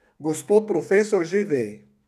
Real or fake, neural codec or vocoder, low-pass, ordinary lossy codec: fake; codec, 32 kHz, 1.9 kbps, SNAC; 14.4 kHz; none